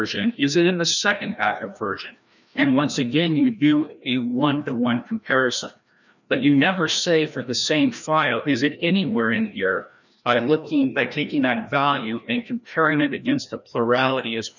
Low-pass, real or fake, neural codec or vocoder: 7.2 kHz; fake; codec, 16 kHz, 1 kbps, FreqCodec, larger model